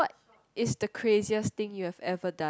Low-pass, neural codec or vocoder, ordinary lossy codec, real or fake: none; none; none; real